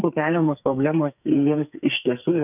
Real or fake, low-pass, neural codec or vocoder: fake; 3.6 kHz; codec, 44.1 kHz, 2.6 kbps, SNAC